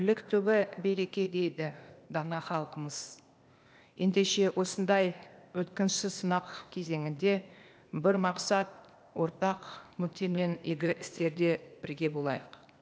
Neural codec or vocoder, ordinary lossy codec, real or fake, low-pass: codec, 16 kHz, 0.8 kbps, ZipCodec; none; fake; none